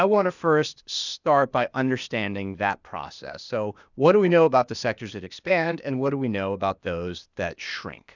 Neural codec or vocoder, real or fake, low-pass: codec, 16 kHz, 0.8 kbps, ZipCodec; fake; 7.2 kHz